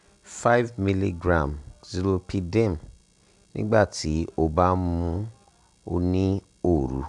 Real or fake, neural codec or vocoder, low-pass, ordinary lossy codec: real; none; 10.8 kHz; none